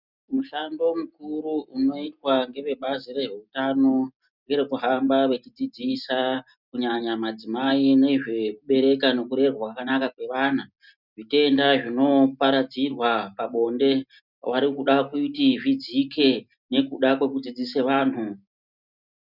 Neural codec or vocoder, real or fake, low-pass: none; real; 5.4 kHz